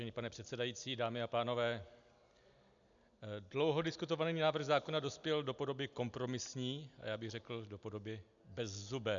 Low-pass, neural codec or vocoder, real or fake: 7.2 kHz; none; real